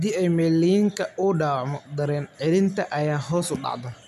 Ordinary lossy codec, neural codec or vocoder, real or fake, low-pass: none; none; real; 14.4 kHz